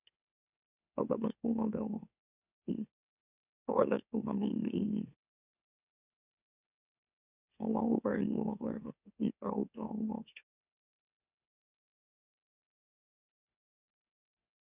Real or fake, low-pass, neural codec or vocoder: fake; 3.6 kHz; autoencoder, 44.1 kHz, a latent of 192 numbers a frame, MeloTTS